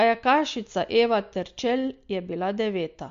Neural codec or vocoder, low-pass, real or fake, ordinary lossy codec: none; 7.2 kHz; real; MP3, 48 kbps